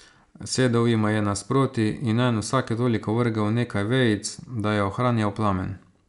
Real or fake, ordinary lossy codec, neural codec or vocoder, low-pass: real; none; none; 10.8 kHz